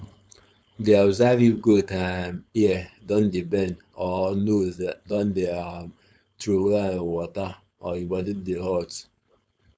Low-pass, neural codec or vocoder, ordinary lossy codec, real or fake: none; codec, 16 kHz, 4.8 kbps, FACodec; none; fake